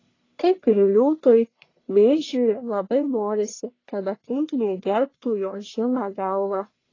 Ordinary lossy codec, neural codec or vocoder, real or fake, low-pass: AAC, 32 kbps; codec, 44.1 kHz, 1.7 kbps, Pupu-Codec; fake; 7.2 kHz